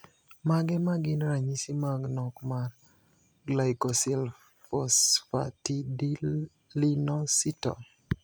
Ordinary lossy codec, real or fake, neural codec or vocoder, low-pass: none; real; none; none